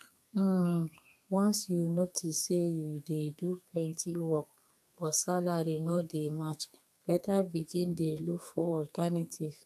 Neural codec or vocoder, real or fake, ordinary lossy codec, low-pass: codec, 32 kHz, 1.9 kbps, SNAC; fake; AAC, 96 kbps; 14.4 kHz